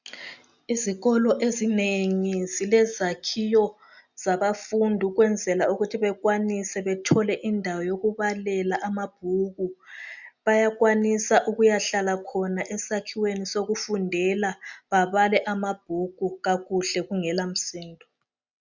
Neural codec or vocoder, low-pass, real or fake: none; 7.2 kHz; real